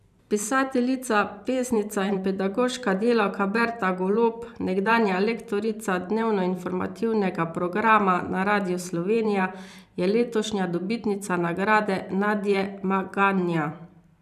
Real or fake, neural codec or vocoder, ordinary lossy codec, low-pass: fake; vocoder, 44.1 kHz, 128 mel bands every 512 samples, BigVGAN v2; none; 14.4 kHz